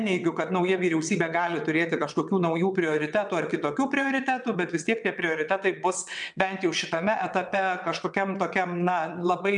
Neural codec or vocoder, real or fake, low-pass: vocoder, 22.05 kHz, 80 mel bands, WaveNeXt; fake; 9.9 kHz